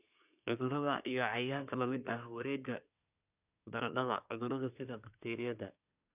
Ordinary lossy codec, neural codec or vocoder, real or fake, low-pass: none; codec, 24 kHz, 1 kbps, SNAC; fake; 3.6 kHz